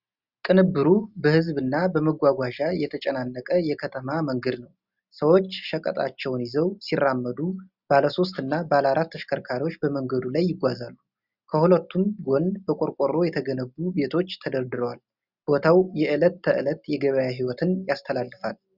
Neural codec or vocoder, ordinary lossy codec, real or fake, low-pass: none; Opus, 64 kbps; real; 5.4 kHz